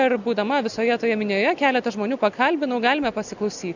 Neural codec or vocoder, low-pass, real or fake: none; 7.2 kHz; real